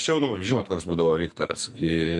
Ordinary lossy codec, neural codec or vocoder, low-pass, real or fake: MP3, 64 kbps; codec, 44.1 kHz, 2.6 kbps, SNAC; 10.8 kHz; fake